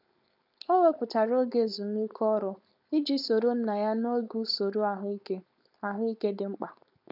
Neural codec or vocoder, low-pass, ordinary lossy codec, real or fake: codec, 16 kHz, 4.8 kbps, FACodec; 5.4 kHz; none; fake